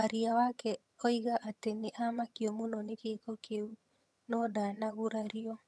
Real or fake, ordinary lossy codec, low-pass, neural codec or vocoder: fake; none; none; vocoder, 22.05 kHz, 80 mel bands, HiFi-GAN